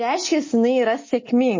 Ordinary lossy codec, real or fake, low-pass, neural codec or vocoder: MP3, 32 kbps; real; 7.2 kHz; none